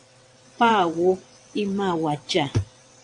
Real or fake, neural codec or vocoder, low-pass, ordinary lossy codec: fake; vocoder, 22.05 kHz, 80 mel bands, WaveNeXt; 9.9 kHz; AAC, 64 kbps